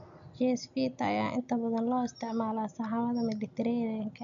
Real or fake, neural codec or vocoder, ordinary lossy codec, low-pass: real; none; none; 7.2 kHz